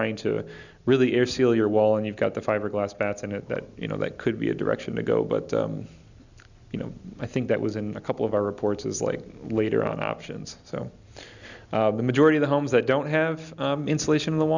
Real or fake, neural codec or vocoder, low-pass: real; none; 7.2 kHz